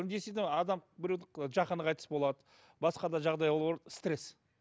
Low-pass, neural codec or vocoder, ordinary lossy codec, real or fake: none; none; none; real